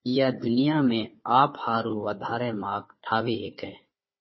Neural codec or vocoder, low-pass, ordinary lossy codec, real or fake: codec, 16 kHz, 4 kbps, FunCodec, trained on Chinese and English, 50 frames a second; 7.2 kHz; MP3, 24 kbps; fake